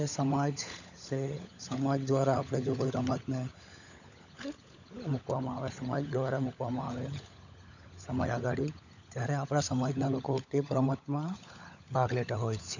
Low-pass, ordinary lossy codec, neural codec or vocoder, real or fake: 7.2 kHz; none; codec, 16 kHz, 16 kbps, FunCodec, trained on LibriTTS, 50 frames a second; fake